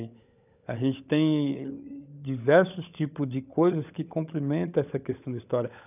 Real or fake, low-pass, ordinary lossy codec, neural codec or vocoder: fake; 3.6 kHz; none; codec, 16 kHz, 16 kbps, FunCodec, trained on LibriTTS, 50 frames a second